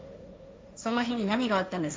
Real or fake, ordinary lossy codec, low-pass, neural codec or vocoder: fake; none; none; codec, 16 kHz, 1.1 kbps, Voila-Tokenizer